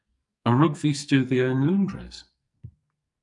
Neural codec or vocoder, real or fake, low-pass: codec, 44.1 kHz, 2.6 kbps, SNAC; fake; 10.8 kHz